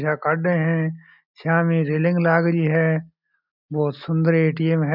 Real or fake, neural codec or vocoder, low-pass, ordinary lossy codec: real; none; 5.4 kHz; none